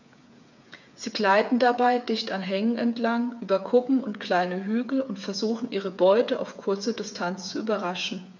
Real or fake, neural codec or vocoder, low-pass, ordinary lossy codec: fake; codec, 16 kHz, 8 kbps, FreqCodec, smaller model; 7.2 kHz; none